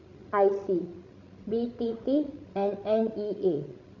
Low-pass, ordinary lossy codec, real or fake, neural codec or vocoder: 7.2 kHz; none; fake; codec, 16 kHz, 16 kbps, FreqCodec, larger model